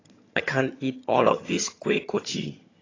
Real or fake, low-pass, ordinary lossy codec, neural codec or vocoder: fake; 7.2 kHz; AAC, 32 kbps; vocoder, 22.05 kHz, 80 mel bands, HiFi-GAN